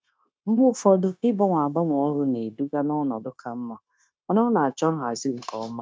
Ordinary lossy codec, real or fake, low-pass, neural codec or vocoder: none; fake; none; codec, 16 kHz, 0.9 kbps, LongCat-Audio-Codec